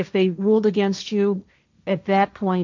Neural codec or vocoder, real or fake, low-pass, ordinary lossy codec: codec, 16 kHz, 1.1 kbps, Voila-Tokenizer; fake; 7.2 kHz; MP3, 64 kbps